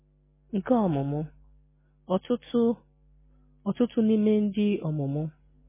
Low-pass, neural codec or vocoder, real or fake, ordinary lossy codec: 3.6 kHz; none; real; MP3, 16 kbps